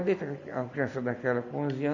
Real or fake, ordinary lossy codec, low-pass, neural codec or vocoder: real; none; 7.2 kHz; none